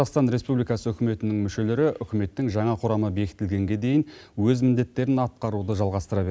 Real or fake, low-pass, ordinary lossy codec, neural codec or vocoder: real; none; none; none